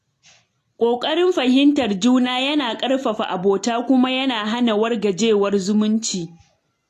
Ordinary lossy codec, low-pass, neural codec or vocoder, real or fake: AAC, 48 kbps; 14.4 kHz; none; real